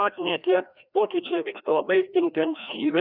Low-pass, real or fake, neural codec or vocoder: 5.4 kHz; fake; codec, 16 kHz, 1 kbps, FreqCodec, larger model